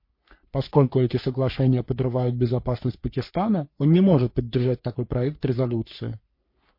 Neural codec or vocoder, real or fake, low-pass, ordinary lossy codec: codec, 44.1 kHz, 3.4 kbps, Pupu-Codec; fake; 5.4 kHz; MP3, 32 kbps